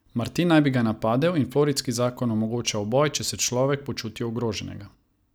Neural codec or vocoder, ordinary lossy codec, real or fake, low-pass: none; none; real; none